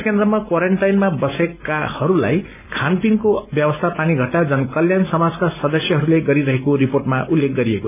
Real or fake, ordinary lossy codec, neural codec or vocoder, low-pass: real; none; none; 3.6 kHz